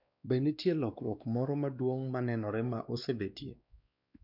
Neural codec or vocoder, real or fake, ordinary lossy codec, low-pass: codec, 16 kHz, 2 kbps, X-Codec, WavLM features, trained on Multilingual LibriSpeech; fake; none; 5.4 kHz